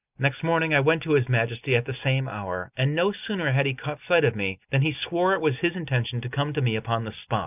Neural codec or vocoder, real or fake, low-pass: none; real; 3.6 kHz